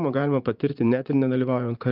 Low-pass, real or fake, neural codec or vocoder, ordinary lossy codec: 5.4 kHz; fake; vocoder, 44.1 kHz, 80 mel bands, Vocos; Opus, 24 kbps